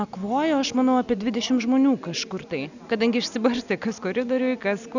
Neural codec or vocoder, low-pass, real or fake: none; 7.2 kHz; real